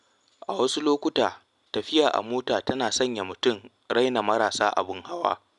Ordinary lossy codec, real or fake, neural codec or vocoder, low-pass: none; real; none; 10.8 kHz